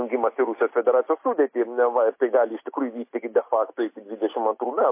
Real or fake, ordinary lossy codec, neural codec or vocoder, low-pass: real; MP3, 24 kbps; none; 3.6 kHz